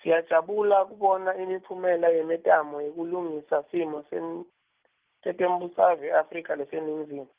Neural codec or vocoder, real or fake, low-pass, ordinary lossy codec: none; real; 3.6 kHz; Opus, 32 kbps